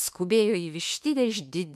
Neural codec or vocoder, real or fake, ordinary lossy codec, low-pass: autoencoder, 48 kHz, 32 numbers a frame, DAC-VAE, trained on Japanese speech; fake; MP3, 96 kbps; 14.4 kHz